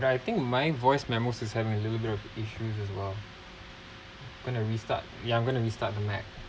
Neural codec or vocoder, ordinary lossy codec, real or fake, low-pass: none; none; real; none